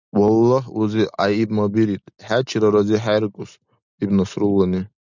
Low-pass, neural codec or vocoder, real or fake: 7.2 kHz; none; real